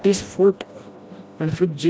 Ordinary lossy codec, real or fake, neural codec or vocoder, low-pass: none; fake; codec, 16 kHz, 1 kbps, FreqCodec, smaller model; none